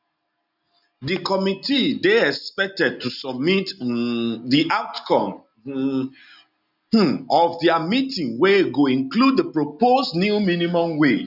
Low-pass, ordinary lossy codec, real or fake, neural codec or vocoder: 5.4 kHz; none; real; none